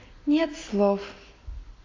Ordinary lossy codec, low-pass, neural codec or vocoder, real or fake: AAC, 32 kbps; 7.2 kHz; none; real